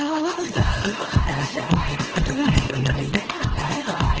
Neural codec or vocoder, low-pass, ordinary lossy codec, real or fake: codec, 24 kHz, 0.9 kbps, WavTokenizer, small release; 7.2 kHz; Opus, 16 kbps; fake